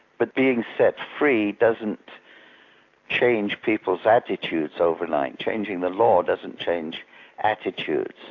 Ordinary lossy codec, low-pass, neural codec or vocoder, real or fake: AAC, 48 kbps; 7.2 kHz; none; real